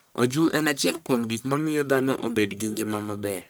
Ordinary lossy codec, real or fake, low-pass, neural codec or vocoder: none; fake; none; codec, 44.1 kHz, 1.7 kbps, Pupu-Codec